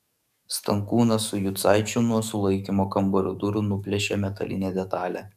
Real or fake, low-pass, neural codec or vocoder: fake; 14.4 kHz; codec, 44.1 kHz, 7.8 kbps, DAC